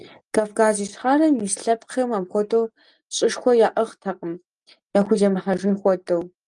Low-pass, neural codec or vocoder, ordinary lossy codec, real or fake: 10.8 kHz; none; Opus, 32 kbps; real